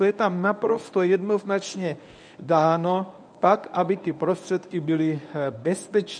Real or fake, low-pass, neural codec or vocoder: fake; 9.9 kHz; codec, 24 kHz, 0.9 kbps, WavTokenizer, medium speech release version 1